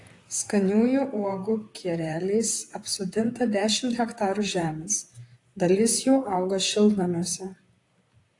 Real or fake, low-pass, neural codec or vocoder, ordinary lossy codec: fake; 10.8 kHz; vocoder, 44.1 kHz, 128 mel bands, Pupu-Vocoder; AAC, 48 kbps